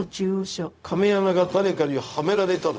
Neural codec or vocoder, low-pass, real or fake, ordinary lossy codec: codec, 16 kHz, 0.4 kbps, LongCat-Audio-Codec; none; fake; none